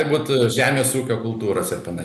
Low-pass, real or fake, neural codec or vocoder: 14.4 kHz; real; none